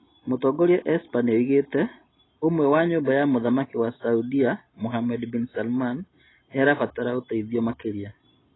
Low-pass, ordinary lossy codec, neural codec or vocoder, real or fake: 7.2 kHz; AAC, 16 kbps; none; real